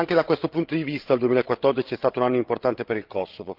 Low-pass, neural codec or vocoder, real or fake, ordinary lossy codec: 5.4 kHz; codec, 16 kHz, 16 kbps, FreqCodec, larger model; fake; Opus, 16 kbps